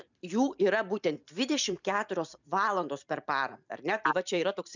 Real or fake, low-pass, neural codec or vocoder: fake; 7.2 kHz; vocoder, 44.1 kHz, 128 mel bands every 256 samples, BigVGAN v2